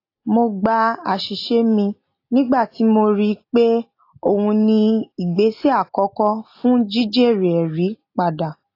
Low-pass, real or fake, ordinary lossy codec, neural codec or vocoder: 5.4 kHz; real; AAC, 32 kbps; none